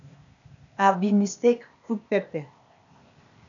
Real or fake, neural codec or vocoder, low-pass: fake; codec, 16 kHz, 0.8 kbps, ZipCodec; 7.2 kHz